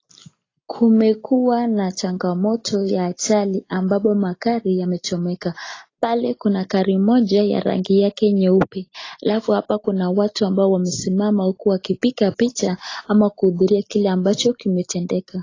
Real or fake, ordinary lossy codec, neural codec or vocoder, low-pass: real; AAC, 32 kbps; none; 7.2 kHz